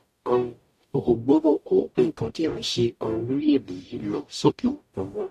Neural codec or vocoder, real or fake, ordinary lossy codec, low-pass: codec, 44.1 kHz, 0.9 kbps, DAC; fake; AAC, 64 kbps; 14.4 kHz